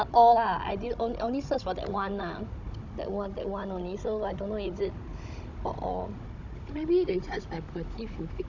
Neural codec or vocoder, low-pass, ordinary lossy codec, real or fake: codec, 16 kHz, 16 kbps, FunCodec, trained on Chinese and English, 50 frames a second; 7.2 kHz; none; fake